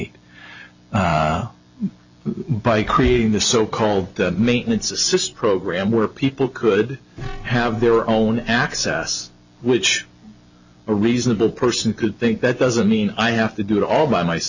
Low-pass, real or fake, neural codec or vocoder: 7.2 kHz; real; none